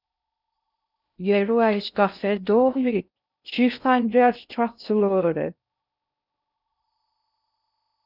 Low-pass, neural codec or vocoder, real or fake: 5.4 kHz; codec, 16 kHz in and 24 kHz out, 0.6 kbps, FocalCodec, streaming, 4096 codes; fake